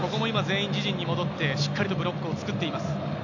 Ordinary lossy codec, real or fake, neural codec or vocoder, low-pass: none; real; none; 7.2 kHz